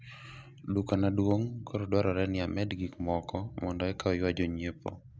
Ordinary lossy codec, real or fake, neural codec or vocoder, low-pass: none; real; none; none